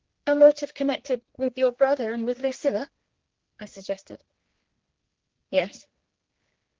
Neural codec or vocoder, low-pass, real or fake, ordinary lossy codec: codec, 16 kHz, 2 kbps, X-Codec, HuBERT features, trained on general audio; 7.2 kHz; fake; Opus, 16 kbps